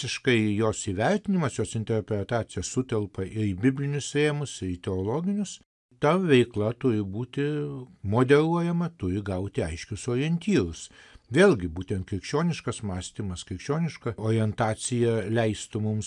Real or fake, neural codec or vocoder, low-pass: real; none; 10.8 kHz